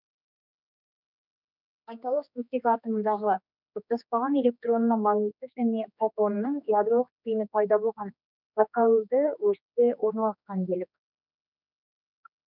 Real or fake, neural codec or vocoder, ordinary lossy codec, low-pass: fake; codec, 16 kHz, 2 kbps, X-Codec, HuBERT features, trained on general audio; none; 5.4 kHz